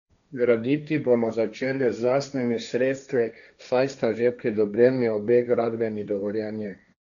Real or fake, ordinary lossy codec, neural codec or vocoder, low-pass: fake; none; codec, 16 kHz, 1.1 kbps, Voila-Tokenizer; 7.2 kHz